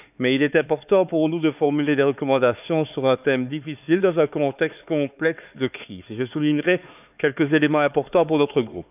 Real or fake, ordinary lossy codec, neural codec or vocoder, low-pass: fake; none; codec, 16 kHz, 4 kbps, X-Codec, HuBERT features, trained on LibriSpeech; 3.6 kHz